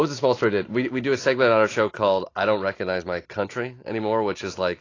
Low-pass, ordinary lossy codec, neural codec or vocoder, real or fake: 7.2 kHz; AAC, 32 kbps; none; real